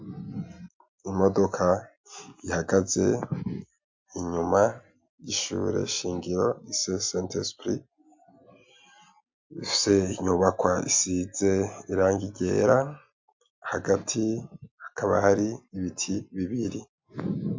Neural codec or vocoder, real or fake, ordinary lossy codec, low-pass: none; real; MP3, 48 kbps; 7.2 kHz